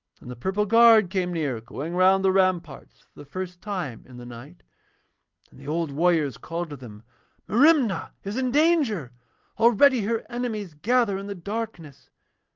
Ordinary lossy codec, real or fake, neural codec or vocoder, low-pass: Opus, 32 kbps; real; none; 7.2 kHz